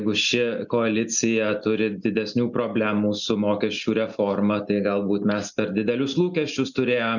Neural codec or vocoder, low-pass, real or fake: none; 7.2 kHz; real